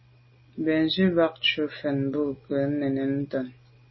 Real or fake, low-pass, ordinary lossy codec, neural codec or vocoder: real; 7.2 kHz; MP3, 24 kbps; none